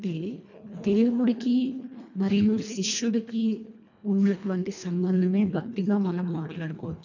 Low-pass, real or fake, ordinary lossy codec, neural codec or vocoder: 7.2 kHz; fake; none; codec, 24 kHz, 1.5 kbps, HILCodec